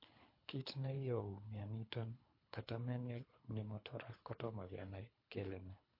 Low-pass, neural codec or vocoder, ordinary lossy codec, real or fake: 5.4 kHz; codec, 24 kHz, 3 kbps, HILCodec; MP3, 32 kbps; fake